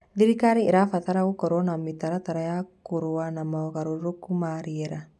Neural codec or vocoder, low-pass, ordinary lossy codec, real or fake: none; none; none; real